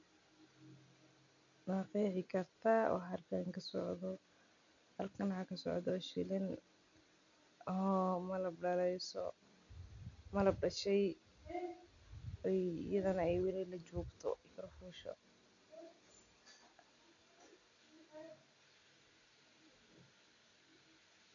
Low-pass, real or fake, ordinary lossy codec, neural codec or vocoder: 7.2 kHz; real; none; none